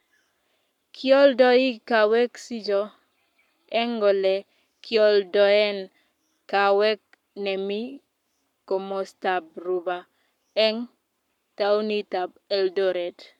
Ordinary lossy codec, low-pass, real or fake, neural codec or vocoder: none; 19.8 kHz; fake; codec, 44.1 kHz, 7.8 kbps, Pupu-Codec